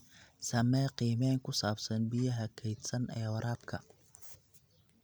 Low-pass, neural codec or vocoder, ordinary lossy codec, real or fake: none; none; none; real